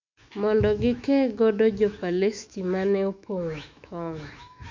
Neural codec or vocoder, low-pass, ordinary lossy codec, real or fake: autoencoder, 48 kHz, 128 numbers a frame, DAC-VAE, trained on Japanese speech; 7.2 kHz; MP3, 48 kbps; fake